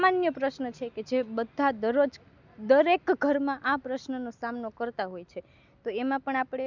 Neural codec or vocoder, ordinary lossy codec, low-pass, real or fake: none; none; 7.2 kHz; real